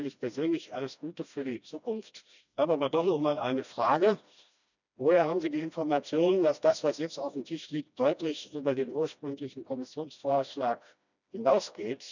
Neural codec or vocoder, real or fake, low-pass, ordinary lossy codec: codec, 16 kHz, 1 kbps, FreqCodec, smaller model; fake; 7.2 kHz; none